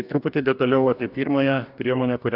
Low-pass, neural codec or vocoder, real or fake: 5.4 kHz; codec, 44.1 kHz, 2.6 kbps, DAC; fake